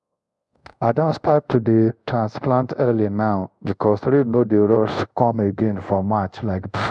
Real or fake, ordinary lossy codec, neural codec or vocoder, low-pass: fake; none; codec, 24 kHz, 0.5 kbps, DualCodec; 10.8 kHz